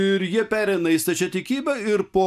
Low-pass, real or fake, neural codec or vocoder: 14.4 kHz; real; none